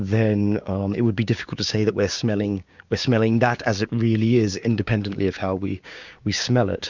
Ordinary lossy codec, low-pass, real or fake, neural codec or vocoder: Opus, 64 kbps; 7.2 kHz; fake; codec, 16 kHz, 6 kbps, DAC